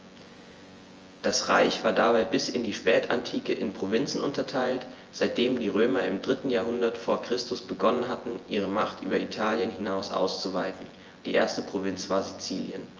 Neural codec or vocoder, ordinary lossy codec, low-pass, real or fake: vocoder, 24 kHz, 100 mel bands, Vocos; Opus, 24 kbps; 7.2 kHz; fake